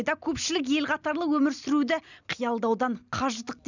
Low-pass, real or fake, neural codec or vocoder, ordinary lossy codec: 7.2 kHz; real; none; none